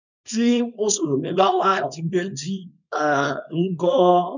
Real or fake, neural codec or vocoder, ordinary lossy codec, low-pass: fake; codec, 24 kHz, 0.9 kbps, WavTokenizer, small release; none; 7.2 kHz